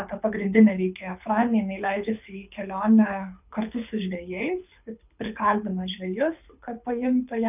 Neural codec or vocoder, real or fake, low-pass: codec, 24 kHz, 6 kbps, HILCodec; fake; 3.6 kHz